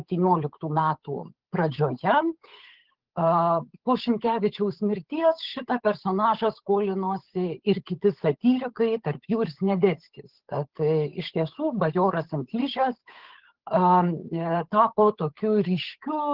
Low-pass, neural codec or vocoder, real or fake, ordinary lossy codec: 5.4 kHz; codec, 16 kHz, 8 kbps, FunCodec, trained on Chinese and English, 25 frames a second; fake; Opus, 16 kbps